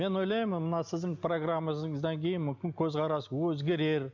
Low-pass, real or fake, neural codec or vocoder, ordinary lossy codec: 7.2 kHz; real; none; none